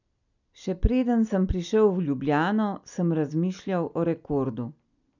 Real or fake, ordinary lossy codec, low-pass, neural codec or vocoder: real; none; 7.2 kHz; none